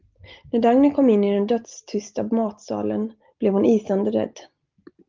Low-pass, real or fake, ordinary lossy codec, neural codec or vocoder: 7.2 kHz; real; Opus, 24 kbps; none